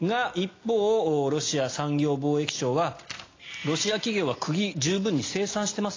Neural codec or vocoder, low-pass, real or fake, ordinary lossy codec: none; 7.2 kHz; real; AAC, 32 kbps